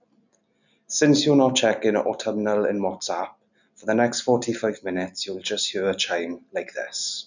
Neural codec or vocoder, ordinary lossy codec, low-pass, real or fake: none; none; 7.2 kHz; real